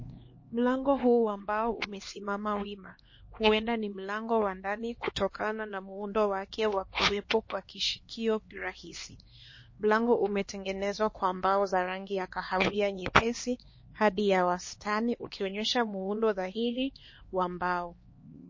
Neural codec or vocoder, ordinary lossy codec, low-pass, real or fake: codec, 16 kHz, 2 kbps, X-Codec, HuBERT features, trained on LibriSpeech; MP3, 32 kbps; 7.2 kHz; fake